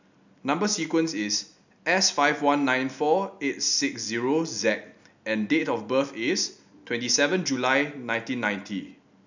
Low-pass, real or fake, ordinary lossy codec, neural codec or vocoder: 7.2 kHz; real; none; none